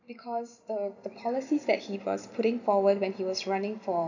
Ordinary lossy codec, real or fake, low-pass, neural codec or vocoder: none; fake; 7.2 kHz; vocoder, 44.1 kHz, 128 mel bands every 256 samples, BigVGAN v2